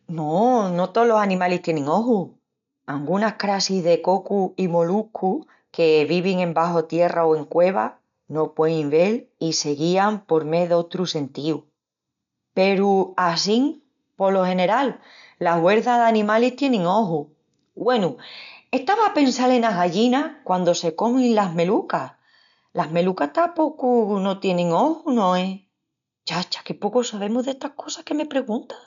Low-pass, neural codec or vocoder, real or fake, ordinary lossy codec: 7.2 kHz; none; real; MP3, 96 kbps